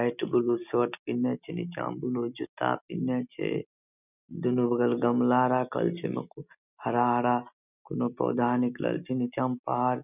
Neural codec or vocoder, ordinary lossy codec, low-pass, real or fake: none; none; 3.6 kHz; real